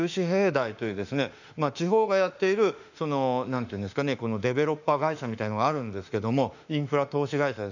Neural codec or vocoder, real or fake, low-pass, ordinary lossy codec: autoencoder, 48 kHz, 32 numbers a frame, DAC-VAE, trained on Japanese speech; fake; 7.2 kHz; none